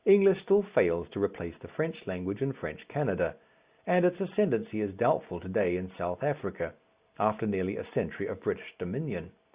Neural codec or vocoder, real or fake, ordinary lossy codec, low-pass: none; real; Opus, 64 kbps; 3.6 kHz